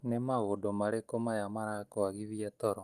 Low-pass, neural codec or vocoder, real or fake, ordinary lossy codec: 14.4 kHz; none; real; Opus, 32 kbps